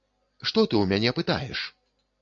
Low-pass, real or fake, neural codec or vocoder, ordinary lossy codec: 7.2 kHz; real; none; AAC, 48 kbps